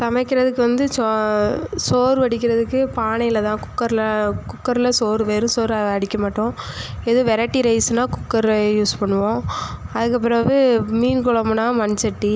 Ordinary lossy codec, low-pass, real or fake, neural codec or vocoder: none; none; real; none